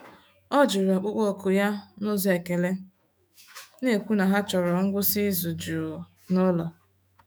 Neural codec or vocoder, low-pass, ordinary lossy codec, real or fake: autoencoder, 48 kHz, 128 numbers a frame, DAC-VAE, trained on Japanese speech; none; none; fake